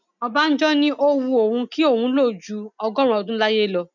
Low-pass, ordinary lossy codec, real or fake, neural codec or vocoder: 7.2 kHz; none; real; none